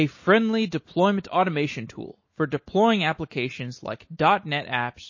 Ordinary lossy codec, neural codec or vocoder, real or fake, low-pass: MP3, 32 kbps; none; real; 7.2 kHz